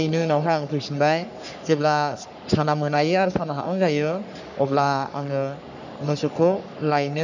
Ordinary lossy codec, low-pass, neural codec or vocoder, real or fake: none; 7.2 kHz; codec, 44.1 kHz, 3.4 kbps, Pupu-Codec; fake